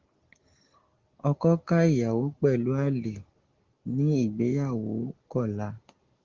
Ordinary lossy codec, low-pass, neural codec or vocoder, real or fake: Opus, 16 kbps; 7.2 kHz; none; real